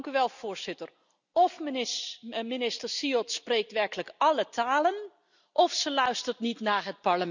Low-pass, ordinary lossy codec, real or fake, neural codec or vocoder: 7.2 kHz; none; real; none